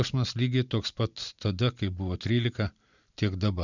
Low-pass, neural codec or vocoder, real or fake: 7.2 kHz; vocoder, 44.1 kHz, 128 mel bands every 512 samples, BigVGAN v2; fake